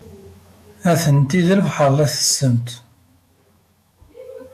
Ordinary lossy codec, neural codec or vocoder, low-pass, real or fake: AAC, 96 kbps; autoencoder, 48 kHz, 128 numbers a frame, DAC-VAE, trained on Japanese speech; 14.4 kHz; fake